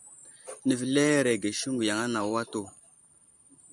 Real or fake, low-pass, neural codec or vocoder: fake; 10.8 kHz; vocoder, 44.1 kHz, 128 mel bands every 512 samples, BigVGAN v2